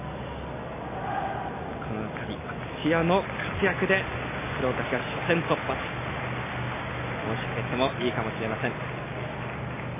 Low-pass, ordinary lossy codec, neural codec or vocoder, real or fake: 3.6 kHz; MP3, 16 kbps; none; real